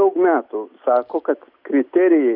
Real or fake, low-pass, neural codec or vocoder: real; 5.4 kHz; none